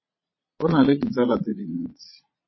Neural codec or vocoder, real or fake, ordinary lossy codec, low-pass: vocoder, 22.05 kHz, 80 mel bands, Vocos; fake; MP3, 24 kbps; 7.2 kHz